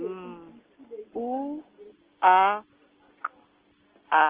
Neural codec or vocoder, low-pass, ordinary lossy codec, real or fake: none; 3.6 kHz; Opus, 16 kbps; real